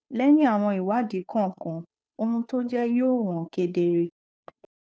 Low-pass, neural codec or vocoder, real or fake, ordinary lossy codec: none; codec, 16 kHz, 2 kbps, FunCodec, trained on Chinese and English, 25 frames a second; fake; none